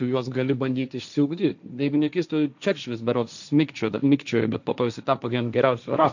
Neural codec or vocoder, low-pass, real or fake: codec, 16 kHz, 1.1 kbps, Voila-Tokenizer; 7.2 kHz; fake